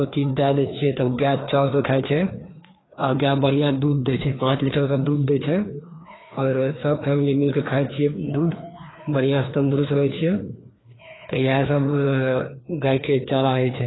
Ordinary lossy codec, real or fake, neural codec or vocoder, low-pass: AAC, 16 kbps; fake; codec, 16 kHz, 2 kbps, FreqCodec, larger model; 7.2 kHz